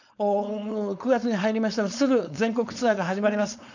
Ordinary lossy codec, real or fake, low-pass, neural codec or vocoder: none; fake; 7.2 kHz; codec, 16 kHz, 4.8 kbps, FACodec